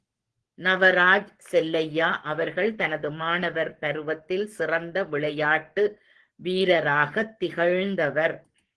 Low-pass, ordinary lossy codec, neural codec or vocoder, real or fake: 10.8 kHz; Opus, 16 kbps; codec, 44.1 kHz, 7.8 kbps, Pupu-Codec; fake